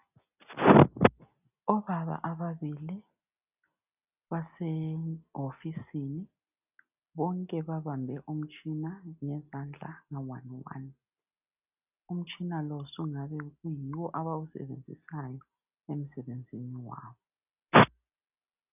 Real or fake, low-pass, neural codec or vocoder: real; 3.6 kHz; none